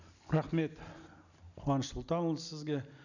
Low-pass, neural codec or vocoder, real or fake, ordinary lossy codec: 7.2 kHz; none; real; none